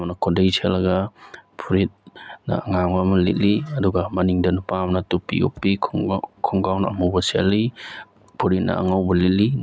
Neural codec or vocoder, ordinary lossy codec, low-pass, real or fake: none; none; none; real